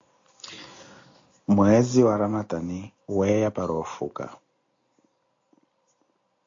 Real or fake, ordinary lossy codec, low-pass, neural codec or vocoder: real; AAC, 48 kbps; 7.2 kHz; none